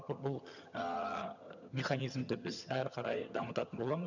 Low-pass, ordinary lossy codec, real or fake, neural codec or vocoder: 7.2 kHz; none; fake; vocoder, 22.05 kHz, 80 mel bands, HiFi-GAN